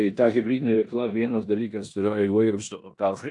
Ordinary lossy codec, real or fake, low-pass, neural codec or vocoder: AAC, 64 kbps; fake; 10.8 kHz; codec, 16 kHz in and 24 kHz out, 0.9 kbps, LongCat-Audio-Codec, four codebook decoder